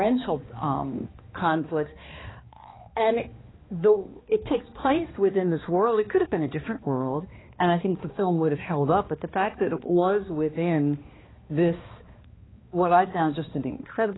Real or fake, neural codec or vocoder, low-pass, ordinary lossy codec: fake; codec, 16 kHz, 2 kbps, X-Codec, HuBERT features, trained on balanced general audio; 7.2 kHz; AAC, 16 kbps